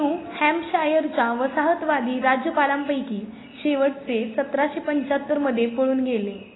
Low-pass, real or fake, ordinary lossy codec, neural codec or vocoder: 7.2 kHz; real; AAC, 16 kbps; none